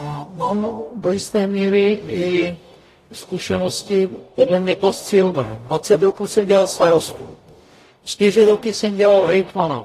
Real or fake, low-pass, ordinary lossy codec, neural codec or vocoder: fake; 14.4 kHz; AAC, 48 kbps; codec, 44.1 kHz, 0.9 kbps, DAC